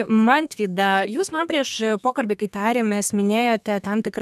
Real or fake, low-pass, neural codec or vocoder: fake; 14.4 kHz; codec, 32 kHz, 1.9 kbps, SNAC